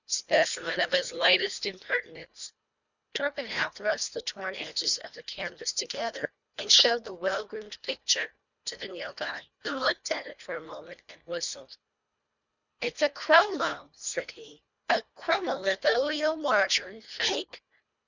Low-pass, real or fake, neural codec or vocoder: 7.2 kHz; fake; codec, 24 kHz, 1.5 kbps, HILCodec